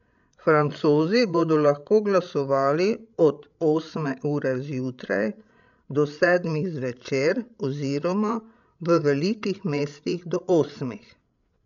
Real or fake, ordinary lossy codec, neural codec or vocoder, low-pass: fake; none; codec, 16 kHz, 16 kbps, FreqCodec, larger model; 7.2 kHz